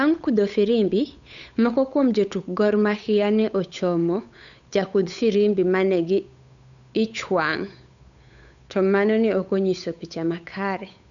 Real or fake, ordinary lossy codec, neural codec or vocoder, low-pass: fake; none; codec, 16 kHz, 8 kbps, FunCodec, trained on Chinese and English, 25 frames a second; 7.2 kHz